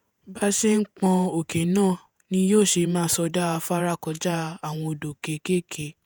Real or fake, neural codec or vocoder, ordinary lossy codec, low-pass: fake; vocoder, 48 kHz, 128 mel bands, Vocos; none; none